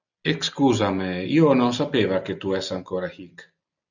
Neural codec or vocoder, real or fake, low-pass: none; real; 7.2 kHz